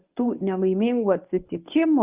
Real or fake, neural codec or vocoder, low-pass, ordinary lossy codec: fake; codec, 24 kHz, 0.9 kbps, WavTokenizer, medium speech release version 1; 3.6 kHz; Opus, 24 kbps